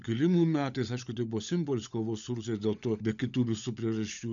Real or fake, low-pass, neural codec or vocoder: fake; 7.2 kHz; codec, 16 kHz, 16 kbps, FunCodec, trained on LibriTTS, 50 frames a second